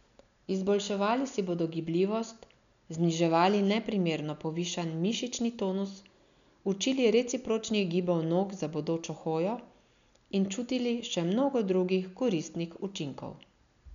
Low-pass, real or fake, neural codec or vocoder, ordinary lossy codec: 7.2 kHz; real; none; none